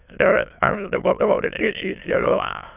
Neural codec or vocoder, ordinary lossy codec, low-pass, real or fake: autoencoder, 22.05 kHz, a latent of 192 numbers a frame, VITS, trained on many speakers; none; 3.6 kHz; fake